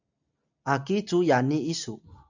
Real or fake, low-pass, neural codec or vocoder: real; 7.2 kHz; none